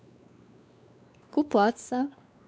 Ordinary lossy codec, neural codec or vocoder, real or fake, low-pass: none; codec, 16 kHz, 2 kbps, X-Codec, WavLM features, trained on Multilingual LibriSpeech; fake; none